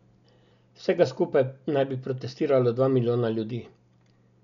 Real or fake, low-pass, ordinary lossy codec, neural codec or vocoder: real; 7.2 kHz; none; none